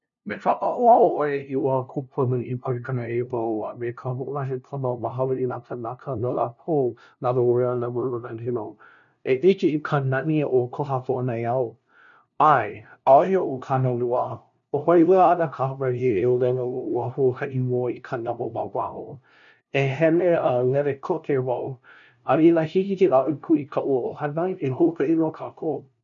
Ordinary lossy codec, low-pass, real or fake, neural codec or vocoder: none; 7.2 kHz; fake; codec, 16 kHz, 0.5 kbps, FunCodec, trained on LibriTTS, 25 frames a second